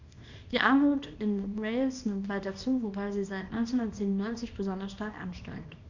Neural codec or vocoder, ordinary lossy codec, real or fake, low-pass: codec, 24 kHz, 0.9 kbps, WavTokenizer, small release; none; fake; 7.2 kHz